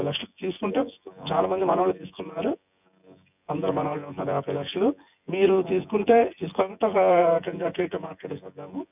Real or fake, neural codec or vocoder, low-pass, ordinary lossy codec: fake; vocoder, 24 kHz, 100 mel bands, Vocos; 3.6 kHz; none